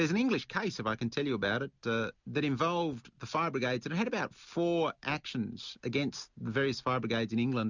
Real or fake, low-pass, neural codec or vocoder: real; 7.2 kHz; none